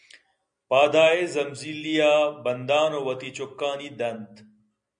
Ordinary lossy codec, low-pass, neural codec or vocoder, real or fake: MP3, 96 kbps; 9.9 kHz; none; real